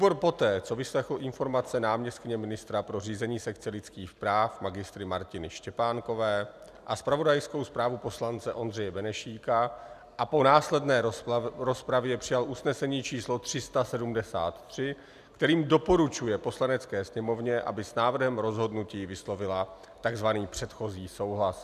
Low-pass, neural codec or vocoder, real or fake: 14.4 kHz; none; real